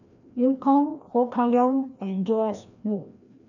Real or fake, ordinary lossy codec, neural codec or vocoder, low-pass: fake; none; codec, 16 kHz, 1 kbps, FreqCodec, larger model; 7.2 kHz